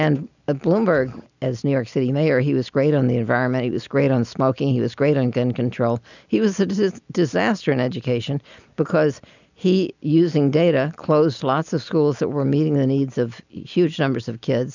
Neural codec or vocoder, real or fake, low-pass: none; real; 7.2 kHz